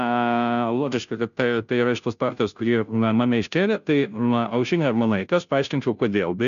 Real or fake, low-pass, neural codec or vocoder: fake; 7.2 kHz; codec, 16 kHz, 0.5 kbps, FunCodec, trained on Chinese and English, 25 frames a second